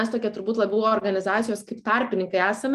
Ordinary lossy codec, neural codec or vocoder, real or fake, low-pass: Opus, 32 kbps; none; real; 14.4 kHz